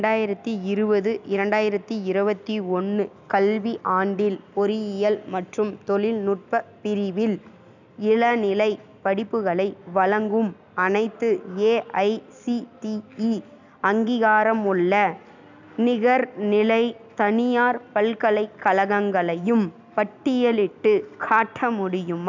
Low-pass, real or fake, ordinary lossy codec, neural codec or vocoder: 7.2 kHz; real; none; none